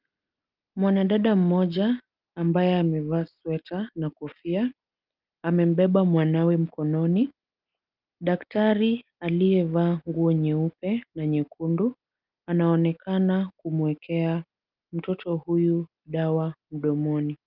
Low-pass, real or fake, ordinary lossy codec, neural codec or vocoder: 5.4 kHz; real; Opus, 32 kbps; none